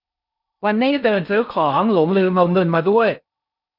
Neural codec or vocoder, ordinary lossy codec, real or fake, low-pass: codec, 16 kHz in and 24 kHz out, 0.6 kbps, FocalCodec, streaming, 4096 codes; none; fake; 5.4 kHz